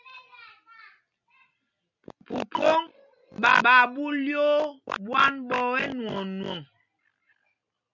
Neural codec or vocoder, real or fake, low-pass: none; real; 7.2 kHz